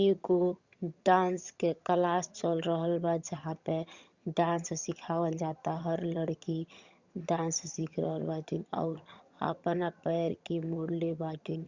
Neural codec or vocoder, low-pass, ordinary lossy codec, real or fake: vocoder, 22.05 kHz, 80 mel bands, HiFi-GAN; 7.2 kHz; Opus, 64 kbps; fake